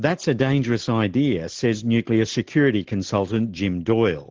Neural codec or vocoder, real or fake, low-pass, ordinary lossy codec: none; real; 7.2 kHz; Opus, 16 kbps